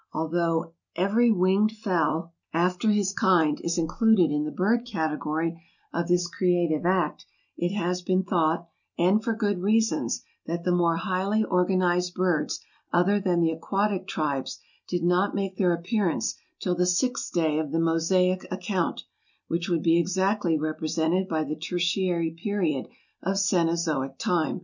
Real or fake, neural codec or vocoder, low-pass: real; none; 7.2 kHz